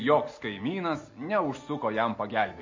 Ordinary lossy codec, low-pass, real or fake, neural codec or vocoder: MP3, 32 kbps; 7.2 kHz; real; none